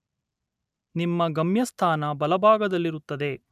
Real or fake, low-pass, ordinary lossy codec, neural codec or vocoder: real; 14.4 kHz; none; none